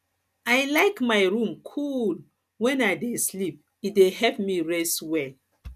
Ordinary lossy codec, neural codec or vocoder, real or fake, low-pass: none; none; real; 14.4 kHz